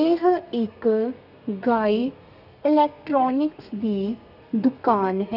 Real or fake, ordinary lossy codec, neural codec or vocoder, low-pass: fake; none; codec, 32 kHz, 1.9 kbps, SNAC; 5.4 kHz